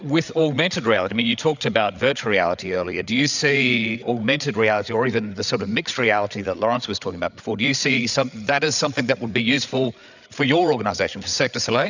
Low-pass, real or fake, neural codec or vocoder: 7.2 kHz; fake; codec, 16 kHz, 8 kbps, FreqCodec, larger model